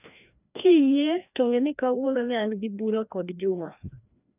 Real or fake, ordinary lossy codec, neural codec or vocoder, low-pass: fake; none; codec, 16 kHz, 1 kbps, FreqCodec, larger model; 3.6 kHz